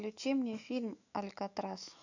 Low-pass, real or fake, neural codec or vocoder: 7.2 kHz; fake; autoencoder, 48 kHz, 128 numbers a frame, DAC-VAE, trained on Japanese speech